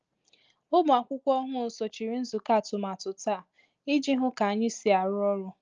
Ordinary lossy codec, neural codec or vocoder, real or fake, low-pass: Opus, 24 kbps; none; real; 7.2 kHz